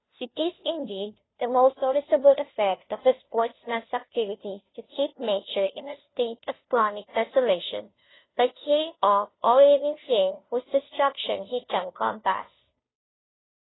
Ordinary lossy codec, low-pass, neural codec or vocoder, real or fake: AAC, 16 kbps; 7.2 kHz; codec, 16 kHz, 0.5 kbps, FunCodec, trained on Chinese and English, 25 frames a second; fake